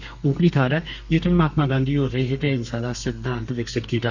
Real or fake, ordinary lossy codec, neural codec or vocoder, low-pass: fake; none; codec, 32 kHz, 1.9 kbps, SNAC; 7.2 kHz